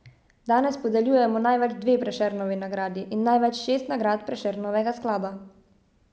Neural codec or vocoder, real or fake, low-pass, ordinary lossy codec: none; real; none; none